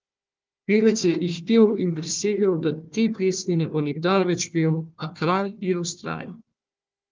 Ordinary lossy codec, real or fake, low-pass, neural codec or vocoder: Opus, 32 kbps; fake; 7.2 kHz; codec, 16 kHz, 1 kbps, FunCodec, trained on Chinese and English, 50 frames a second